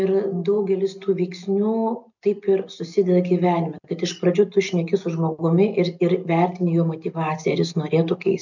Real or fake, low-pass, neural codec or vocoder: real; 7.2 kHz; none